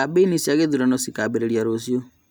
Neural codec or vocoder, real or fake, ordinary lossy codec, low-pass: none; real; none; none